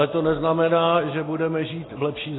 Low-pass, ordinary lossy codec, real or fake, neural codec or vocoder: 7.2 kHz; AAC, 16 kbps; real; none